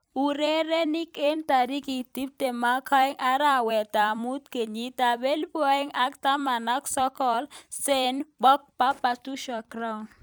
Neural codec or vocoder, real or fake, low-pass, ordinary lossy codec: vocoder, 44.1 kHz, 128 mel bands every 256 samples, BigVGAN v2; fake; none; none